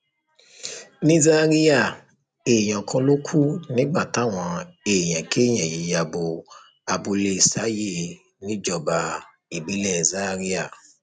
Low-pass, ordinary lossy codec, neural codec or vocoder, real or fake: 9.9 kHz; none; none; real